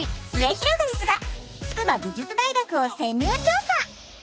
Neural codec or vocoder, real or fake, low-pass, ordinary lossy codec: codec, 16 kHz, 2 kbps, X-Codec, HuBERT features, trained on balanced general audio; fake; none; none